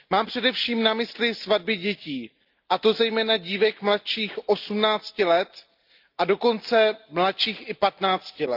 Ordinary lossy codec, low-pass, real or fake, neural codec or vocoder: Opus, 24 kbps; 5.4 kHz; real; none